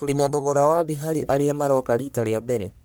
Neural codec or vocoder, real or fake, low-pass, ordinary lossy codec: codec, 44.1 kHz, 1.7 kbps, Pupu-Codec; fake; none; none